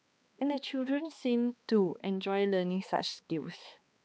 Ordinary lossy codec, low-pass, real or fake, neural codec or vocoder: none; none; fake; codec, 16 kHz, 2 kbps, X-Codec, HuBERT features, trained on balanced general audio